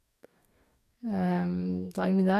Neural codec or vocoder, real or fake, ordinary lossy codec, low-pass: codec, 44.1 kHz, 2.6 kbps, DAC; fake; none; 14.4 kHz